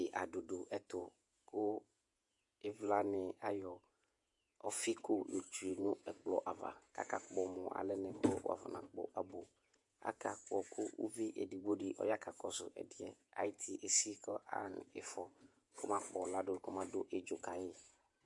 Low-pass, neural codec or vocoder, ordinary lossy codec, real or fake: 10.8 kHz; vocoder, 48 kHz, 128 mel bands, Vocos; MP3, 64 kbps; fake